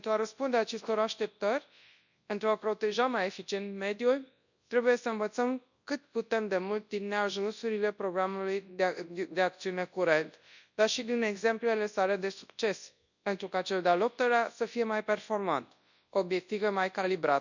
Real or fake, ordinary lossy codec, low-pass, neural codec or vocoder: fake; none; 7.2 kHz; codec, 24 kHz, 0.9 kbps, WavTokenizer, large speech release